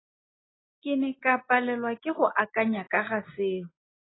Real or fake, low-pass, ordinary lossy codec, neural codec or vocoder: real; 7.2 kHz; AAC, 16 kbps; none